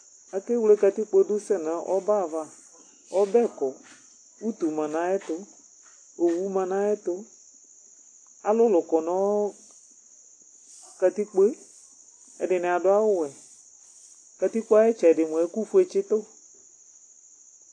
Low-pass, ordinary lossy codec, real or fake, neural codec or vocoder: 9.9 kHz; AAC, 48 kbps; real; none